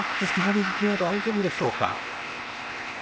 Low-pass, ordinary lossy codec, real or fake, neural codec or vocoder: none; none; fake; codec, 16 kHz, 0.8 kbps, ZipCodec